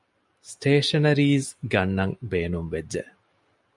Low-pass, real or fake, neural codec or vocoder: 10.8 kHz; real; none